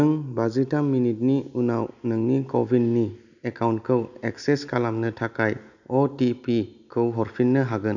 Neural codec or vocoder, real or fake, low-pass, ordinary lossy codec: none; real; 7.2 kHz; none